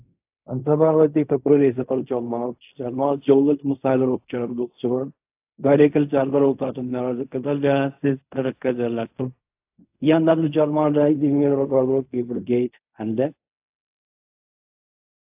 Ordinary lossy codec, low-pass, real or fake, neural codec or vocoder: AAC, 32 kbps; 3.6 kHz; fake; codec, 16 kHz in and 24 kHz out, 0.4 kbps, LongCat-Audio-Codec, fine tuned four codebook decoder